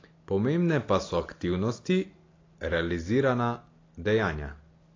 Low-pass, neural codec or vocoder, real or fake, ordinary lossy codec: 7.2 kHz; none; real; AAC, 32 kbps